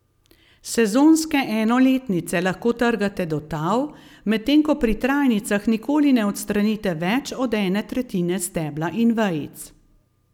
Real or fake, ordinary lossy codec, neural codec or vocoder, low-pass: real; none; none; 19.8 kHz